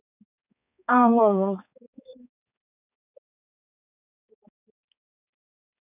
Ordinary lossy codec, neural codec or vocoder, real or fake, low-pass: none; codec, 16 kHz, 4 kbps, X-Codec, HuBERT features, trained on balanced general audio; fake; 3.6 kHz